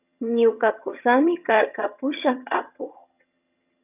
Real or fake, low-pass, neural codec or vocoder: fake; 3.6 kHz; vocoder, 22.05 kHz, 80 mel bands, HiFi-GAN